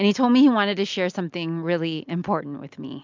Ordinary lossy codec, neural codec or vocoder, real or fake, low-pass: MP3, 64 kbps; none; real; 7.2 kHz